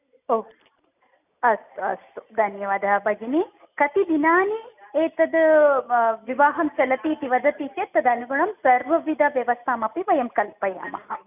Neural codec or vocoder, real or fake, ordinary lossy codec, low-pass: none; real; none; 3.6 kHz